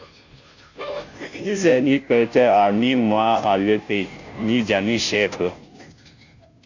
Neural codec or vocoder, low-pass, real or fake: codec, 16 kHz, 0.5 kbps, FunCodec, trained on Chinese and English, 25 frames a second; 7.2 kHz; fake